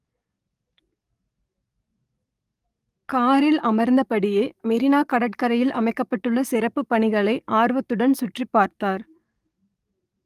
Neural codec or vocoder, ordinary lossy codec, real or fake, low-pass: codec, 44.1 kHz, 7.8 kbps, DAC; Opus, 32 kbps; fake; 14.4 kHz